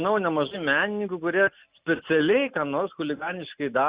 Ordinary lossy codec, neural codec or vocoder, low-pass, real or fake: Opus, 64 kbps; none; 3.6 kHz; real